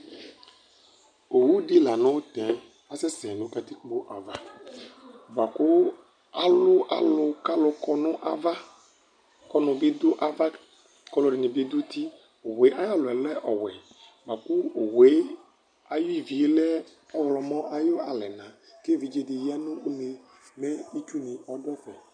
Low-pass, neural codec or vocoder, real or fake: 9.9 kHz; none; real